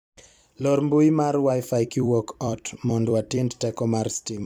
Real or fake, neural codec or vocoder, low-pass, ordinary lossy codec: fake; vocoder, 44.1 kHz, 128 mel bands every 256 samples, BigVGAN v2; 19.8 kHz; none